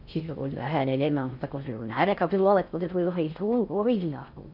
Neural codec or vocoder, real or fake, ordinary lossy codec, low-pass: codec, 16 kHz in and 24 kHz out, 0.6 kbps, FocalCodec, streaming, 4096 codes; fake; none; 5.4 kHz